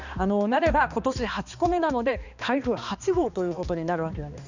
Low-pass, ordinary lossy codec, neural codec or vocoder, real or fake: 7.2 kHz; none; codec, 16 kHz, 2 kbps, X-Codec, HuBERT features, trained on balanced general audio; fake